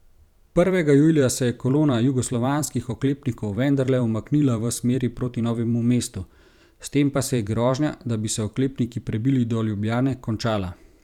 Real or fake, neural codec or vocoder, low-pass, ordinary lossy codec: fake; vocoder, 44.1 kHz, 128 mel bands every 512 samples, BigVGAN v2; 19.8 kHz; none